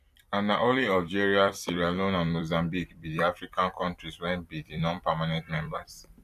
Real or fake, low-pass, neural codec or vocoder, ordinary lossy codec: fake; 14.4 kHz; vocoder, 44.1 kHz, 128 mel bands every 256 samples, BigVGAN v2; none